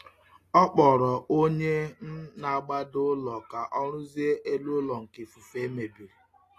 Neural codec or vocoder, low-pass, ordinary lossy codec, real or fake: none; 14.4 kHz; AAC, 48 kbps; real